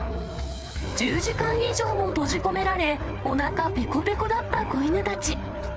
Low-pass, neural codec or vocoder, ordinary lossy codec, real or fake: none; codec, 16 kHz, 4 kbps, FreqCodec, larger model; none; fake